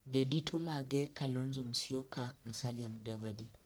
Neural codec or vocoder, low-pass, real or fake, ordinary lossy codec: codec, 44.1 kHz, 1.7 kbps, Pupu-Codec; none; fake; none